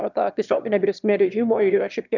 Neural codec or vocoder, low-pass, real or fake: autoencoder, 22.05 kHz, a latent of 192 numbers a frame, VITS, trained on one speaker; 7.2 kHz; fake